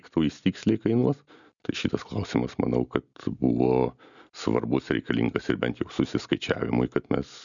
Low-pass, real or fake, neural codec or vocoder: 7.2 kHz; real; none